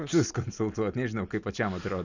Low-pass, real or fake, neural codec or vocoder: 7.2 kHz; real; none